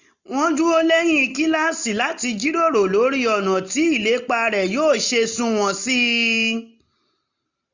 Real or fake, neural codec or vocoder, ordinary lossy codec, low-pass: real; none; none; 7.2 kHz